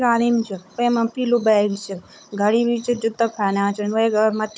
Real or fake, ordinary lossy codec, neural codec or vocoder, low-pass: fake; none; codec, 16 kHz, 16 kbps, FunCodec, trained on Chinese and English, 50 frames a second; none